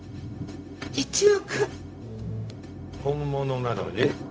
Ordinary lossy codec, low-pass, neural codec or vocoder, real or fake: none; none; codec, 16 kHz, 0.4 kbps, LongCat-Audio-Codec; fake